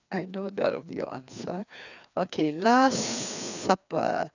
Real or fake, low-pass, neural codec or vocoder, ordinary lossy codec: fake; 7.2 kHz; codec, 16 kHz, 2 kbps, FreqCodec, larger model; none